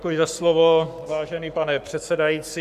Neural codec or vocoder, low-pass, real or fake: codec, 44.1 kHz, 7.8 kbps, Pupu-Codec; 14.4 kHz; fake